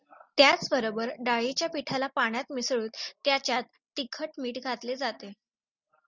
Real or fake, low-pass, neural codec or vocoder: real; 7.2 kHz; none